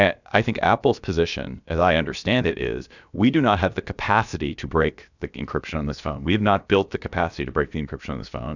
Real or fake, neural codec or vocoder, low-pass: fake; codec, 16 kHz, about 1 kbps, DyCAST, with the encoder's durations; 7.2 kHz